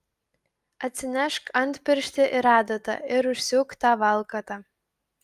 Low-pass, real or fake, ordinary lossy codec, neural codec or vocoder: 14.4 kHz; real; Opus, 32 kbps; none